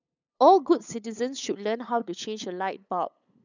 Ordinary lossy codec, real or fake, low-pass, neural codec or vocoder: none; fake; 7.2 kHz; codec, 16 kHz, 8 kbps, FunCodec, trained on LibriTTS, 25 frames a second